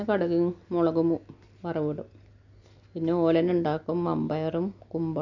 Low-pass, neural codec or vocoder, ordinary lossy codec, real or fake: 7.2 kHz; none; none; real